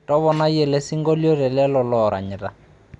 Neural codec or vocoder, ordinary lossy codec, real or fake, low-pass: none; none; real; 10.8 kHz